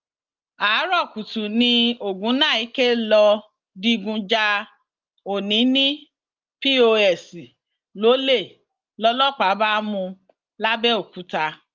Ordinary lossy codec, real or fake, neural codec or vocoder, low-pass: Opus, 32 kbps; real; none; 7.2 kHz